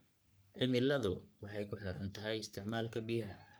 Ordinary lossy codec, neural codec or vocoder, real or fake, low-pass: none; codec, 44.1 kHz, 3.4 kbps, Pupu-Codec; fake; none